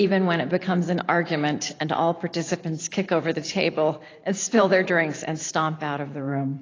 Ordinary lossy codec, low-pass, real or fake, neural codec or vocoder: AAC, 32 kbps; 7.2 kHz; fake; vocoder, 44.1 kHz, 128 mel bands every 512 samples, BigVGAN v2